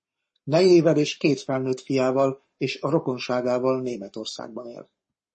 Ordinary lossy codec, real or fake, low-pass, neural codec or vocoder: MP3, 32 kbps; fake; 10.8 kHz; codec, 44.1 kHz, 7.8 kbps, Pupu-Codec